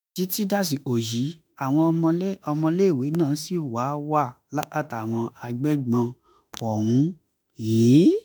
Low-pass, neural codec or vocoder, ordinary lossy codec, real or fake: none; autoencoder, 48 kHz, 32 numbers a frame, DAC-VAE, trained on Japanese speech; none; fake